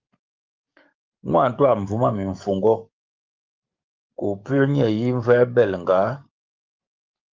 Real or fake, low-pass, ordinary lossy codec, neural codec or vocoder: fake; 7.2 kHz; Opus, 24 kbps; codec, 44.1 kHz, 7.8 kbps, DAC